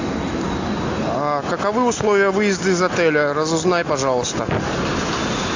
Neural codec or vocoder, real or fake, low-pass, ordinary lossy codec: none; real; 7.2 kHz; AAC, 48 kbps